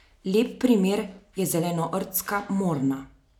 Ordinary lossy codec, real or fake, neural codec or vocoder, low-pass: none; real; none; 19.8 kHz